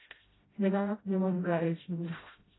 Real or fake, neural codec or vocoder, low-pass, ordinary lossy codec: fake; codec, 16 kHz, 0.5 kbps, FreqCodec, smaller model; 7.2 kHz; AAC, 16 kbps